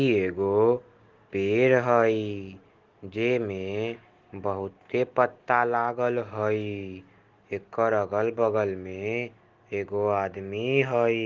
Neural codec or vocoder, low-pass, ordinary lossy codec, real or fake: none; 7.2 kHz; Opus, 16 kbps; real